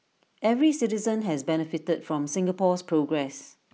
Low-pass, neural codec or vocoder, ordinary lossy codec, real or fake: none; none; none; real